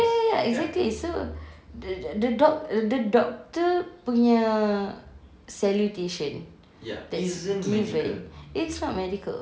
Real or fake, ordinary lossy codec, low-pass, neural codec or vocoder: real; none; none; none